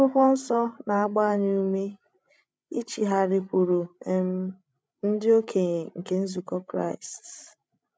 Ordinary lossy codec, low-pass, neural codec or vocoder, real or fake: none; none; codec, 16 kHz, 16 kbps, FreqCodec, larger model; fake